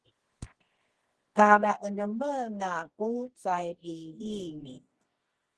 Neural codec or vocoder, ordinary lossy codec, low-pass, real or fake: codec, 24 kHz, 0.9 kbps, WavTokenizer, medium music audio release; Opus, 16 kbps; 10.8 kHz; fake